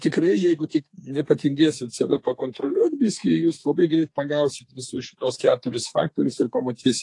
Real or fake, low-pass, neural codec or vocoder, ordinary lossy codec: fake; 10.8 kHz; codec, 32 kHz, 1.9 kbps, SNAC; AAC, 48 kbps